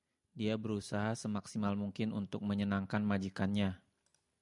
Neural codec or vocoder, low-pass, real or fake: none; 10.8 kHz; real